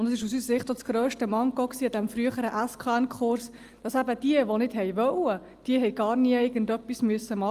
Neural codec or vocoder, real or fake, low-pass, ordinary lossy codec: none; real; 14.4 kHz; Opus, 32 kbps